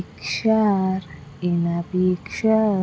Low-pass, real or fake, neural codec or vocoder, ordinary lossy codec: none; real; none; none